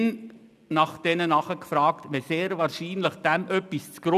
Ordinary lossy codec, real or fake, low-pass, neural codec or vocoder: none; real; 14.4 kHz; none